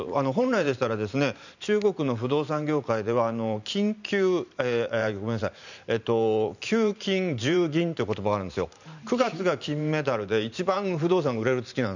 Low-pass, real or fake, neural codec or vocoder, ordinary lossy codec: 7.2 kHz; fake; vocoder, 44.1 kHz, 128 mel bands every 512 samples, BigVGAN v2; none